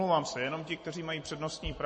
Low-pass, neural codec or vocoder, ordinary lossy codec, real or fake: 10.8 kHz; vocoder, 44.1 kHz, 128 mel bands every 256 samples, BigVGAN v2; MP3, 32 kbps; fake